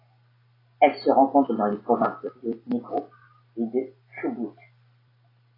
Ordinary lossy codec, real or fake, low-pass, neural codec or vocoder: AAC, 24 kbps; real; 5.4 kHz; none